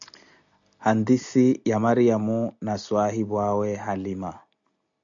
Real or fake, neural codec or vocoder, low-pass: real; none; 7.2 kHz